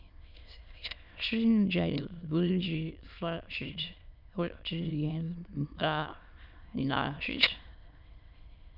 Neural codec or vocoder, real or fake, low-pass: autoencoder, 22.05 kHz, a latent of 192 numbers a frame, VITS, trained on many speakers; fake; 5.4 kHz